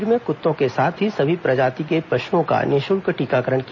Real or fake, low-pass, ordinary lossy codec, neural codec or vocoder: real; 7.2 kHz; none; none